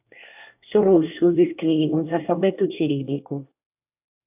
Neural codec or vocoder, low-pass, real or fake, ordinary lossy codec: codec, 24 kHz, 1 kbps, SNAC; 3.6 kHz; fake; AAC, 32 kbps